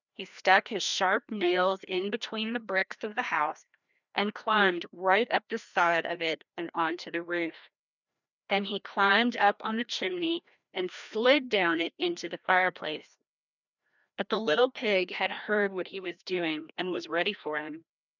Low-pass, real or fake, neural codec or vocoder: 7.2 kHz; fake; codec, 16 kHz, 1 kbps, FreqCodec, larger model